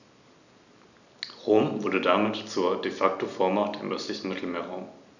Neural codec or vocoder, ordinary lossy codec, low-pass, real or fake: none; none; 7.2 kHz; real